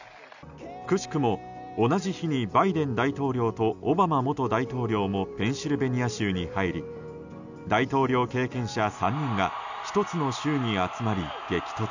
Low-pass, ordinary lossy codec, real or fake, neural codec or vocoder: 7.2 kHz; none; real; none